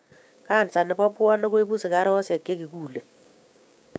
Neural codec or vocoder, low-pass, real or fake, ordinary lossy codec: codec, 16 kHz, 6 kbps, DAC; none; fake; none